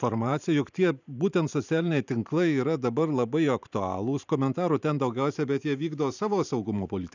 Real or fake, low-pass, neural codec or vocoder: fake; 7.2 kHz; vocoder, 44.1 kHz, 128 mel bands every 512 samples, BigVGAN v2